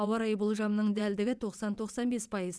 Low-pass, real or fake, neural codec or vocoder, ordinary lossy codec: none; fake; vocoder, 22.05 kHz, 80 mel bands, WaveNeXt; none